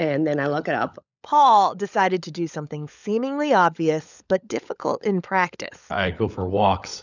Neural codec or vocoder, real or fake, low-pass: codec, 16 kHz, 16 kbps, FunCodec, trained on LibriTTS, 50 frames a second; fake; 7.2 kHz